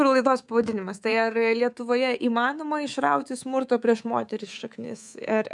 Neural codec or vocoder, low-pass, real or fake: codec, 24 kHz, 3.1 kbps, DualCodec; 10.8 kHz; fake